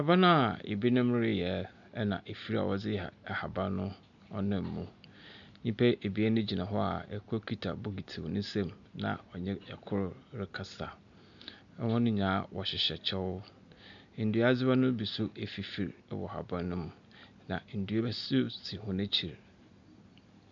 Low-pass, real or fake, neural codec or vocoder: 7.2 kHz; real; none